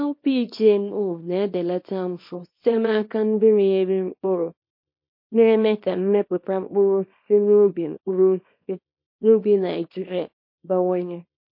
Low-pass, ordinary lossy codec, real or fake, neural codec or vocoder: 5.4 kHz; MP3, 32 kbps; fake; codec, 24 kHz, 0.9 kbps, WavTokenizer, small release